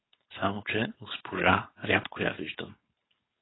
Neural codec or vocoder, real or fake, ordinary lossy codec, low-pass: codec, 16 kHz, 4.8 kbps, FACodec; fake; AAC, 16 kbps; 7.2 kHz